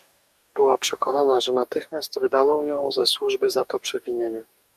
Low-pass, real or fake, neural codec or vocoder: 14.4 kHz; fake; codec, 44.1 kHz, 2.6 kbps, DAC